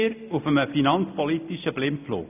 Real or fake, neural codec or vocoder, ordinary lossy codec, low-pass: real; none; none; 3.6 kHz